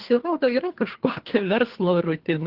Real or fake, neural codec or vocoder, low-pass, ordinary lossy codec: fake; codec, 24 kHz, 3 kbps, HILCodec; 5.4 kHz; Opus, 16 kbps